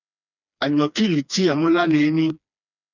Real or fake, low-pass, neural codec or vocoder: fake; 7.2 kHz; codec, 16 kHz, 2 kbps, FreqCodec, smaller model